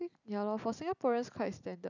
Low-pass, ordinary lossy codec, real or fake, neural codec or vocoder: 7.2 kHz; none; real; none